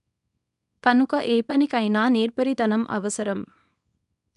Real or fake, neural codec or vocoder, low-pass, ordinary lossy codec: fake; codec, 24 kHz, 0.9 kbps, WavTokenizer, small release; 10.8 kHz; none